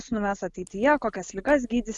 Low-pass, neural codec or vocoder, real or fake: 10.8 kHz; none; real